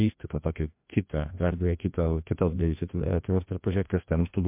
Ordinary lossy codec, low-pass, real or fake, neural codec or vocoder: MP3, 32 kbps; 3.6 kHz; fake; codec, 16 kHz, 1 kbps, FreqCodec, larger model